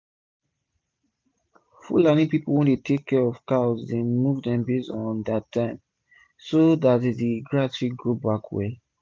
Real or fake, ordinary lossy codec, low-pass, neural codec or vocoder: real; none; none; none